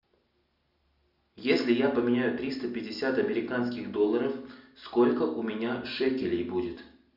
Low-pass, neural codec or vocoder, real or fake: 5.4 kHz; none; real